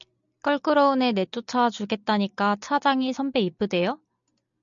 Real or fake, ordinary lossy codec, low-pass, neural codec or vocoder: real; MP3, 96 kbps; 7.2 kHz; none